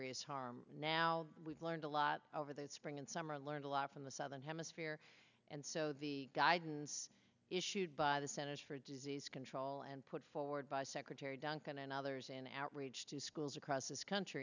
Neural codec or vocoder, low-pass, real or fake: none; 7.2 kHz; real